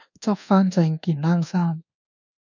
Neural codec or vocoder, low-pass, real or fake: codec, 24 kHz, 1.2 kbps, DualCodec; 7.2 kHz; fake